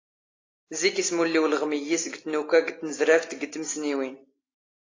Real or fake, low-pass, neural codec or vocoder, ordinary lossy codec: real; 7.2 kHz; none; AAC, 32 kbps